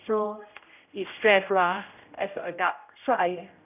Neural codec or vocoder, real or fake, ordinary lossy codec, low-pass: codec, 16 kHz, 0.5 kbps, X-Codec, HuBERT features, trained on general audio; fake; none; 3.6 kHz